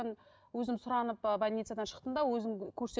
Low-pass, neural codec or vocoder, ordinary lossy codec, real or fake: none; none; none; real